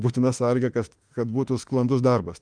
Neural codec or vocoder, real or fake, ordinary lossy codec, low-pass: autoencoder, 48 kHz, 32 numbers a frame, DAC-VAE, trained on Japanese speech; fake; Opus, 32 kbps; 9.9 kHz